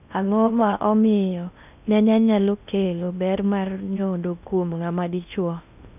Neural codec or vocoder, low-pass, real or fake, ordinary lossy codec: codec, 16 kHz in and 24 kHz out, 0.6 kbps, FocalCodec, streaming, 4096 codes; 3.6 kHz; fake; none